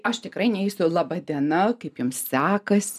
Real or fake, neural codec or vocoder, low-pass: real; none; 14.4 kHz